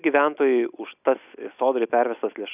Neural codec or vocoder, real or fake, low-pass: none; real; 3.6 kHz